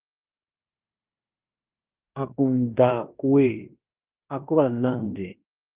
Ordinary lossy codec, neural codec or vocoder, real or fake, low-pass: Opus, 32 kbps; codec, 16 kHz in and 24 kHz out, 0.9 kbps, LongCat-Audio-Codec, fine tuned four codebook decoder; fake; 3.6 kHz